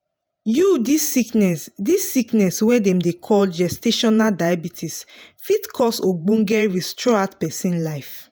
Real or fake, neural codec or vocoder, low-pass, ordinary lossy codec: fake; vocoder, 48 kHz, 128 mel bands, Vocos; none; none